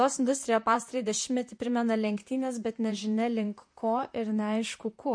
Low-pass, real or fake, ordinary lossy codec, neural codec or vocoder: 9.9 kHz; fake; MP3, 48 kbps; vocoder, 44.1 kHz, 128 mel bands, Pupu-Vocoder